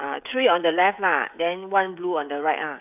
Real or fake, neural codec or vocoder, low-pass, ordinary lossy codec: fake; codec, 16 kHz, 16 kbps, FreqCodec, smaller model; 3.6 kHz; none